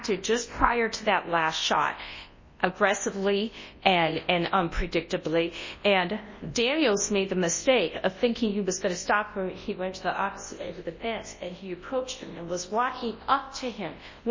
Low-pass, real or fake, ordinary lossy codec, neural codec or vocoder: 7.2 kHz; fake; MP3, 32 kbps; codec, 24 kHz, 0.9 kbps, WavTokenizer, large speech release